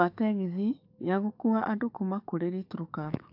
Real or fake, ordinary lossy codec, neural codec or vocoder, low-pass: fake; AAC, 48 kbps; codec, 44.1 kHz, 7.8 kbps, DAC; 5.4 kHz